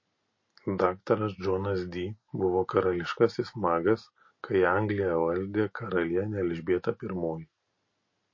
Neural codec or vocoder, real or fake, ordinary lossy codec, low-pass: none; real; MP3, 32 kbps; 7.2 kHz